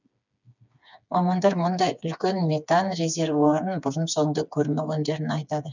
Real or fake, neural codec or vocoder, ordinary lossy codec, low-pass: fake; codec, 16 kHz, 4 kbps, FreqCodec, smaller model; none; 7.2 kHz